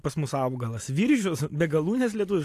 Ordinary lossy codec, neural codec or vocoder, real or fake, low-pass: AAC, 64 kbps; none; real; 14.4 kHz